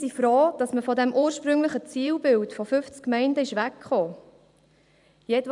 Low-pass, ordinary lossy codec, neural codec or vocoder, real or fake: 10.8 kHz; none; vocoder, 44.1 kHz, 128 mel bands every 512 samples, BigVGAN v2; fake